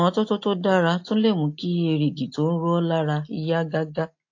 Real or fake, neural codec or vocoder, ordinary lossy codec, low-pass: real; none; AAC, 48 kbps; 7.2 kHz